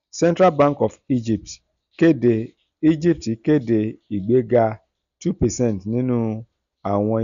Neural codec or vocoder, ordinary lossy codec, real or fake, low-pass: none; none; real; 7.2 kHz